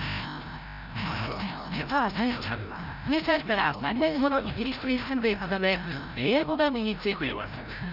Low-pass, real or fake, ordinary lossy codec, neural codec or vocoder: 5.4 kHz; fake; none; codec, 16 kHz, 0.5 kbps, FreqCodec, larger model